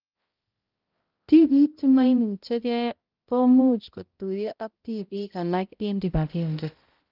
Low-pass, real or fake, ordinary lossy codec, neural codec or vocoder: 5.4 kHz; fake; Opus, 32 kbps; codec, 16 kHz, 0.5 kbps, X-Codec, HuBERT features, trained on balanced general audio